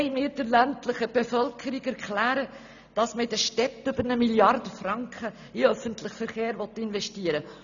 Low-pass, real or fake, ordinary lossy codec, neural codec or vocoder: 7.2 kHz; real; none; none